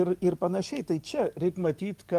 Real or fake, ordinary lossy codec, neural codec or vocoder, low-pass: fake; Opus, 32 kbps; codec, 44.1 kHz, 7.8 kbps, DAC; 14.4 kHz